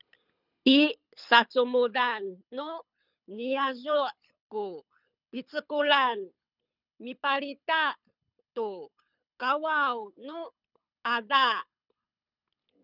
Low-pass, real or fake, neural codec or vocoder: 5.4 kHz; fake; codec, 24 kHz, 6 kbps, HILCodec